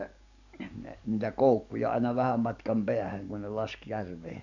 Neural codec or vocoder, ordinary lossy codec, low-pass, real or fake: codec, 44.1 kHz, 7.8 kbps, DAC; none; 7.2 kHz; fake